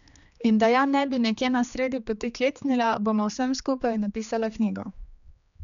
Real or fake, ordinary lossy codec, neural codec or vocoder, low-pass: fake; none; codec, 16 kHz, 2 kbps, X-Codec, HuBERT features, trained on general audio; 7.2 kHz